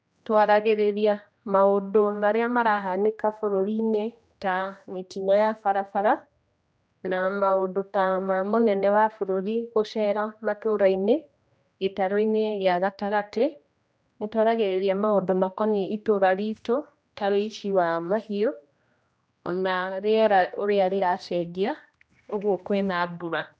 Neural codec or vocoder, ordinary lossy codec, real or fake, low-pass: codec, 16 kHz, 1 kbps, X-Codec, HuBERT features, trained on general audio; none; fake; none